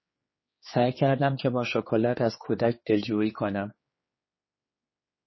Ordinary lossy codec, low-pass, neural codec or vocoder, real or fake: MP3, 24 kbps; 7.2 kHz; codec, 16 kHz, 4 kbps, X-Codec, HuBERT features, trained on general audio; fake